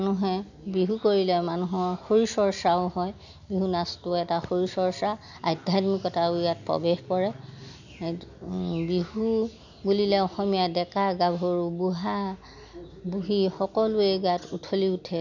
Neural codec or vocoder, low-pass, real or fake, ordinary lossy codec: none; 7.2 kHz; real; none